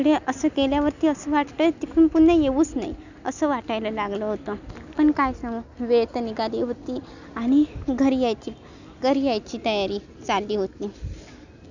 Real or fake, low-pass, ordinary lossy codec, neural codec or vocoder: real; 7.2 kHz; none; none